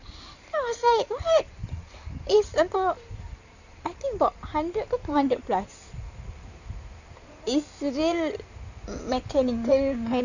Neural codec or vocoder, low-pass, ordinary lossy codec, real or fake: codec, 16 kHz in and 24 kHz out, 2.2 kbps, FireRedTTS-2 codec; 7.2 kHz; none; fake